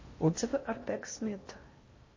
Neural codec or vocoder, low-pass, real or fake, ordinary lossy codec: codec, 16 kHz in and 24 kHz out, 0.6 kbps, FocalCodec, streaming, 4096 codes; 7.2 kHz; fake; MP3, 32 kbps